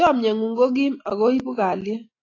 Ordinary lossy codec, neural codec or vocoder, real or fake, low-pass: AAC, 32 kbps; none; real; 7.2 kHz